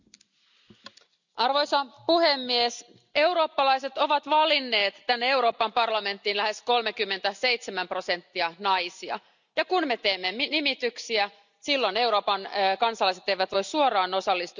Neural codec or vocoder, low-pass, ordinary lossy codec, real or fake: none; 7.2 kHz; none; real